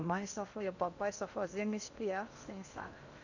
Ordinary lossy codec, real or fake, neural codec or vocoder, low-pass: none; fake; codec, 16 kHz in and 24 kHz out, 0.8 kbps, FocalCodec, streaming, 65536 codes; 7.2 kHz